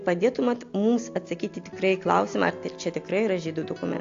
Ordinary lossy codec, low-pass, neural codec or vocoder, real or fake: AAC, 48 kbps; 7.2 kHz; none; real